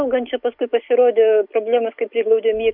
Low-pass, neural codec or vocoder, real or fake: 5.4 kHz; none; real